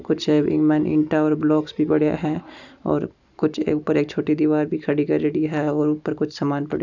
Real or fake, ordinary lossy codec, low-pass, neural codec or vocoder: real; none; 7.2 kHz; none